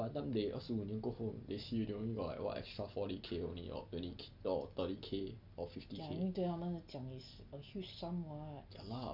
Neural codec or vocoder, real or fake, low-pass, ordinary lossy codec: none; real; 5.4 kHz; none